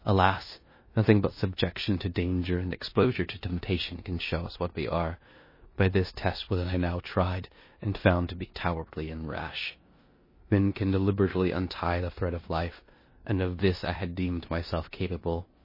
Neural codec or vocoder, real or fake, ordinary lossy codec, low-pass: codec, 16 kHz in and 24 kHz out, 0.9 kbps, LongCat-Audio-Codec, four codebook decoder; fake; MP3, 24 kbps; 5.4 kHz